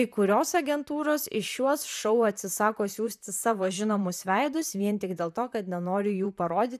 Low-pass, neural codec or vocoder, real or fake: 14.4 kHz; vocoder, 44.1 kHz, 128 mel bands, Pupu-Vocoder; fake